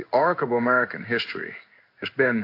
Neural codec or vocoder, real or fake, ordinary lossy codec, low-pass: codec, 16 kHz in and 24 kHz out, 1 kbps, XY-Tokenizer; fake; AAC, 32 kbps; 5.4 kHz